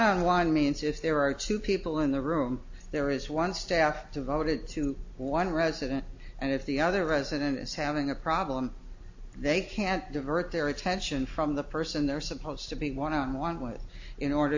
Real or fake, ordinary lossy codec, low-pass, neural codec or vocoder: real; AAC, 48 kbps; 7.2 kHz; none